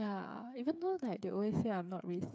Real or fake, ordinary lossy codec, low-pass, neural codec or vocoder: fake; none; none; codec, 16 kHz, 8 kbps, FreqCodec, smaller model